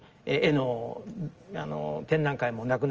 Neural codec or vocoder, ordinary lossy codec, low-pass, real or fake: none; Opus, 24 kbps; 7.2 kHz; real